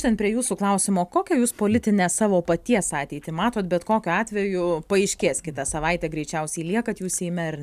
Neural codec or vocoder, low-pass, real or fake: none; 14.4 kHz; real